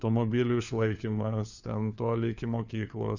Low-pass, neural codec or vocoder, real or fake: 7.2 kHz; codec, 16 kHz, 4 kbps, FunCodec, trained on LibriTTS, 50 frames a second; fake